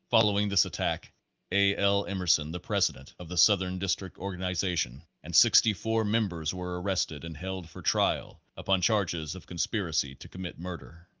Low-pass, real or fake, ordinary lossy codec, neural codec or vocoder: 7.2 kHz; real; Opus, 32 kbps; none